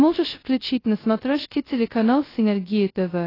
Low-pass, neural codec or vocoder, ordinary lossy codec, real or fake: 5.4 kHz; codec, 16 kHz, 0.2 kbps, FocalCodec; AAC, 24 kbps; fake